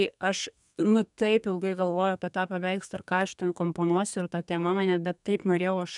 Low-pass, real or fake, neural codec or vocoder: 10.8 kHz; fake; codec, 32 kHz, 1.9 kbps, SNAC